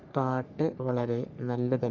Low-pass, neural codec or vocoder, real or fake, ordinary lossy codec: 7.2 kHz; codec, 44.1 kHz, 3.4 kbps, Pupu-Codec; fake; none